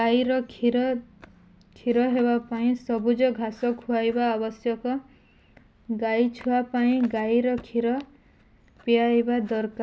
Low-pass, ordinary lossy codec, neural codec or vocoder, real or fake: none; none; none; real